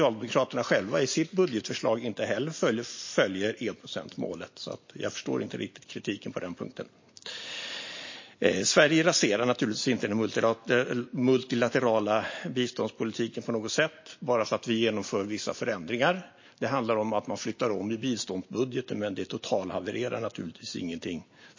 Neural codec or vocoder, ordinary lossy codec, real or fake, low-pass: none; MP3, 32 kbps; real; 7.2 kHz